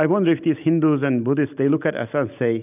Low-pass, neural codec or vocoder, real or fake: 3.6 kHz; none; real